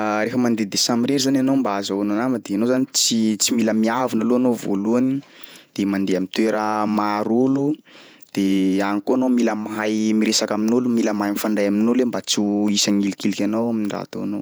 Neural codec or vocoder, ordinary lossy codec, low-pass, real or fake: vocoder, 48 kHz, 128 mel bands, Vocos; none; none; fake